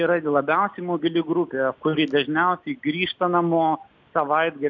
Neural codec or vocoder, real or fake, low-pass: none; real; 7.2 kHz